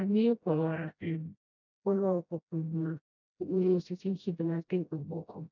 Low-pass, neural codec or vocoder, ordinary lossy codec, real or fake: 7.2 kHz; codec, 16 kHz, 0.5 kbps, FreqCodec, smaller model; none; fake